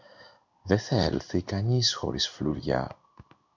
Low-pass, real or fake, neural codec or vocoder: 7.2 kHz; fake; codec, 16 kHz in and 24 kHz out, 1 kbps, XY-Tokenizer